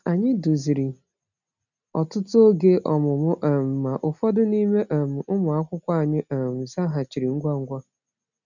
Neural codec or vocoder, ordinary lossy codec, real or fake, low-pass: none; none; real; 7.2 kHz